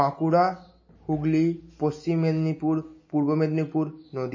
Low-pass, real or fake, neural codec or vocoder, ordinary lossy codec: 7.2 kHz; real; none; MP3, 32 kbps